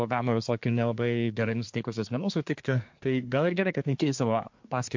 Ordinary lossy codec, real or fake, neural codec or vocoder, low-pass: MP3, 48 kbps; fake; codec, 24 kHz, 1 kbps, SNAC; 7.2 kHz